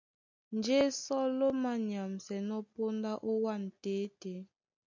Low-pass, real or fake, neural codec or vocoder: 7.2 kHz; real; none